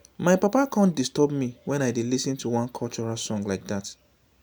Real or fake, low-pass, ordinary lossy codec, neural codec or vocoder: real; none; none; none